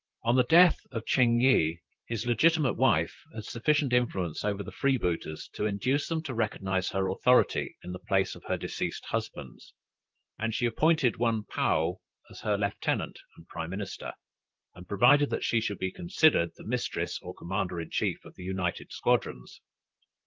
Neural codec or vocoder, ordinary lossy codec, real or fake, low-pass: vocoder, 44.1 kHz, 128 mel bands, Pupu-Vocoder; Opus, 32 kbps; fake; 7.2 kHz